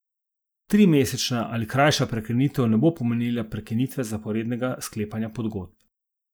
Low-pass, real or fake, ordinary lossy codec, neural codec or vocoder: none; real; none; none